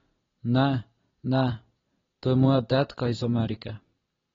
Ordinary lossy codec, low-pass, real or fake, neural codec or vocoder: AAC, 24 kbps; 7.2 kHz; real; none